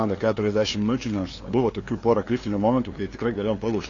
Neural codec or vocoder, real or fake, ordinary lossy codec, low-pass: codec, 16 kHz, 2 kbps, FunCodec, trained on LibriTTS, 25 frames a second; fake; AAC, 32 kbps; 7.2 kHz